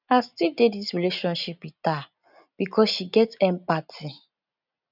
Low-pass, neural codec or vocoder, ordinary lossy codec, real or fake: 5.4 kHz; none; none; real